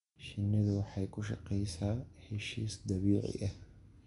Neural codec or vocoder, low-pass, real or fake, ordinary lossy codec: none; 10.8 kHz; real; none